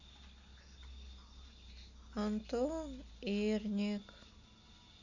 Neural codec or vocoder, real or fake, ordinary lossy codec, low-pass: none; real; none; 7.2 kHz